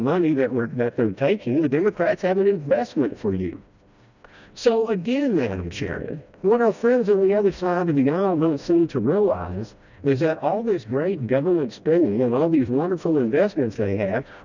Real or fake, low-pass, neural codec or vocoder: fake; 7.2 kHz; codec, 16 kHz, 1 kbps, FreqCodec, smaller model